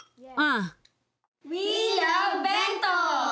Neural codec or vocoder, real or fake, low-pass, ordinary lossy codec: none; real; none; none